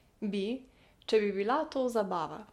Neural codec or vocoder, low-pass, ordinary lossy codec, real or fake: none; 19.8 kHz; MP3, 64 kbps; real